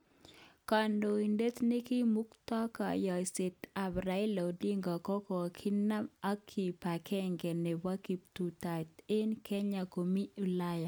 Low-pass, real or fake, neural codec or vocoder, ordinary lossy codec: none; real; none; none